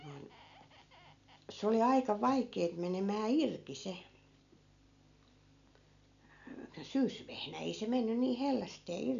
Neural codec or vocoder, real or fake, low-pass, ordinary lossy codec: none; real; 7.2 kHz; none